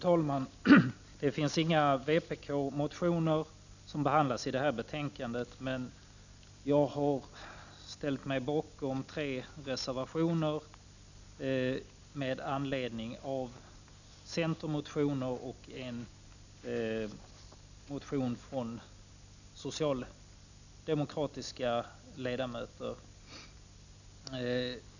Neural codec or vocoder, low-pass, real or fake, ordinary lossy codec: none; 7.2 kHz; real; none